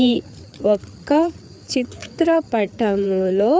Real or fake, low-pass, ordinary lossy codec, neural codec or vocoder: fake; none; none; codec, 16 kHz, 4 kbps, FreqCodec, larger model